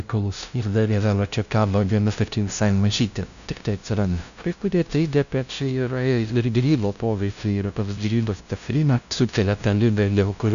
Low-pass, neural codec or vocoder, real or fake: 7.2 kHz; codec, 16 kHz, 0.5 kbps, FunCodec, trained on LibriTTS, 25 frames a second; fake